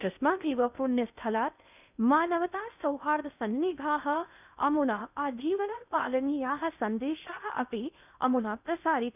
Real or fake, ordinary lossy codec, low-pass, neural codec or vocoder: fake; none; 3.6 kHz; codec, 16 kHz in and 24 kHz out, 0.6 kbps, FocalCodec, streaming, 2048 codes